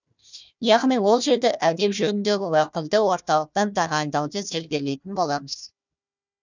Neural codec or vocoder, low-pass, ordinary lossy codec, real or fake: codec, 16 kHz, 1 kbps, FunCodec, trained on Chinese and English, 50 frames a second; 7.2 kHz; none; fake